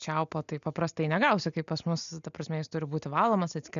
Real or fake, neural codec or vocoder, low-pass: real; none; 7.2 kHz